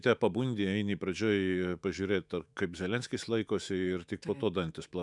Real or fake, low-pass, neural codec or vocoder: fake; 10.8 kHz; autoencoder, 48 kHz, 128 numbers a frame, DAC-VAE, trained on Japanese speech